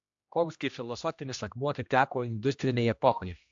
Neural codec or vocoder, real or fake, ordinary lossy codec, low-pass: codec, 16 kHz, 1 kbps, X-Codec, HuBERT features, trained on general audio; fake; AAC, 64 kbps; 7.2 kHz